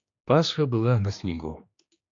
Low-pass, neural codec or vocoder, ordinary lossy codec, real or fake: 7.2 kHz; codec, 16 kHz, 2 kbps, X-Codec, HuBERT features, trained on general audio; AAC, 48 kbps; fake